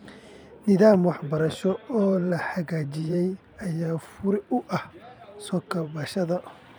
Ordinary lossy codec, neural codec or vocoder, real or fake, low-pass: none; vocoder, 44.1 kHz, 128 mel bands every 512 samples, BigVGAN v2; fake; none